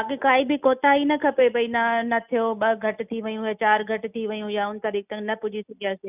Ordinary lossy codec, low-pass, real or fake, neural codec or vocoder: none; 3.6 kHz; real; none